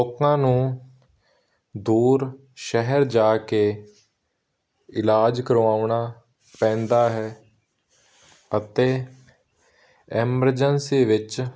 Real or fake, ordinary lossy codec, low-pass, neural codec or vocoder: real; none; none; none